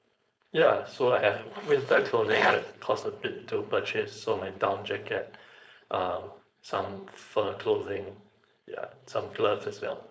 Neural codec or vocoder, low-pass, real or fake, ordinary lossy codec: codec, 16 kHz, 4.8 kbps, FACodec; none; fake; none